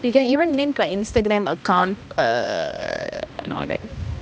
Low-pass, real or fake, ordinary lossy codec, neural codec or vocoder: none; fake; none; codec, 16 kHz, 1 kbps, X-Codec, HuBERT features, trained on balanced general audio